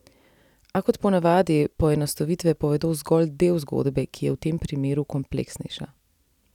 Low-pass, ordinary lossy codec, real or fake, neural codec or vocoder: 19.8 kHz; none; real; none